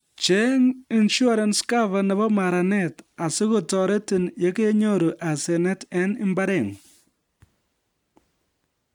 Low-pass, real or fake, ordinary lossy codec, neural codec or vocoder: 19.8 kHz; real; none; none